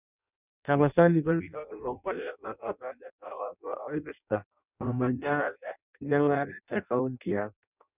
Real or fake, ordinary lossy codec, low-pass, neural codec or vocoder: fake; none; 3.6 kHz; codec, 16 kHz in and 24 kHz out, 0.6 kbps, FireRedTTS-2 codec